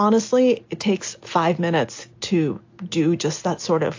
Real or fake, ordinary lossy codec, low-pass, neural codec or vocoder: real; AAC, 48 kbps; 7.2 kHz; none